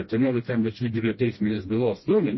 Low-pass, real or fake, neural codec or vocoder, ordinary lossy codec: 7.2 kHz; fake; codec, 16 kHz, 1 kbps, FreqCodec, smaller model; MP3, 24 kbps